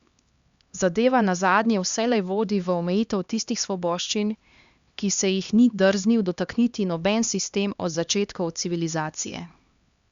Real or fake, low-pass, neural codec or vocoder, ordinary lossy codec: fake; 7.2 kHz; codec, 16 kHz, 2 kbps, X-Codec, HuBERT features, trained on LibriSpeech; Opus, 64 kbps